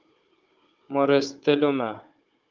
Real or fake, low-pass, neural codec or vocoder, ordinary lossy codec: fake; 7.2 kHz; codec, 16 kHz, 16 kbps, FunCodec, trained on Chinese and English, 50 frames a second; Opus, 24 kbps